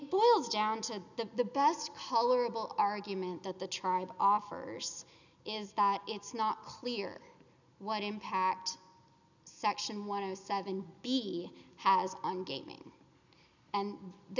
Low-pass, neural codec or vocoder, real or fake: 7.2 kHz; none; real